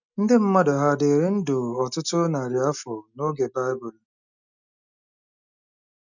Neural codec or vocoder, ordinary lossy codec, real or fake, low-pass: none; none; real; 7.2 kHz